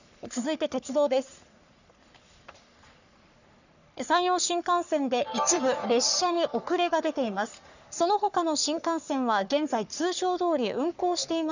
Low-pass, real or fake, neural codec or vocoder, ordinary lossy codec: 7.2 kHz; fake; codec, 44.1 kHz, 3.4 kbps, Pupu-Codec; none